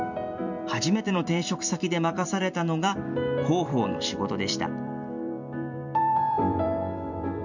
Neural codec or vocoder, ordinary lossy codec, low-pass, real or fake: vocoder, 44.1 kHz, 128 mel bands every 256 samples, BigVGAN v2; none; 7.2 kHz; fake